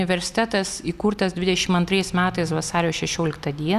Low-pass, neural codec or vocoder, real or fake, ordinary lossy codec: 14.4 kHz; none; real; Opus, 64 kbps